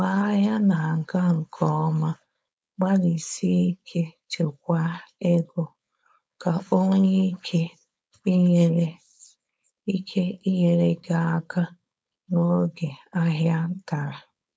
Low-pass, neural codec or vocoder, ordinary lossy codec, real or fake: none; codec, 16 kHz, 4.8 kbps, FACodec; none; fake